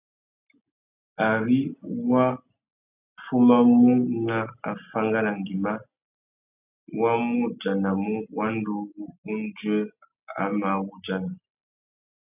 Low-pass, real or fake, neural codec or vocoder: 3.6 kHz; real; none